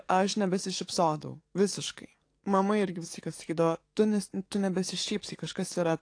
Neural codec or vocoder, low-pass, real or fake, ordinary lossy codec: codec, 44.1 kHz, 7.8 kbps, DAC; 9.9 kHz; fake; AAC, 48 kbps